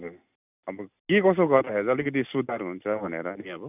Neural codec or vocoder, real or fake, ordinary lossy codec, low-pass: none; real; none; 3.6 kHz